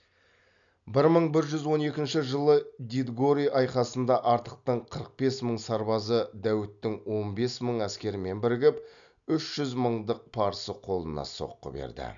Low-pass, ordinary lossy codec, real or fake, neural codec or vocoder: 7.2 kHz; none; real; none